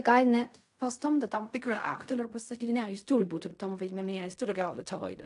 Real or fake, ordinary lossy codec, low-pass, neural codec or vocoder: fake; AAC, 64 kbps; 10.8 kHz; codec, 16 kHz in and 24 kHz out, 0.4 kbps, LongCat-Audio-Codec, fine tuned four codebook decoder